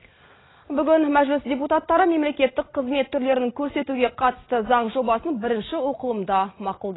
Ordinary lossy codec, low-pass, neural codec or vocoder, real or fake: AAC, 16 kbps; 7.2 kHz; none; real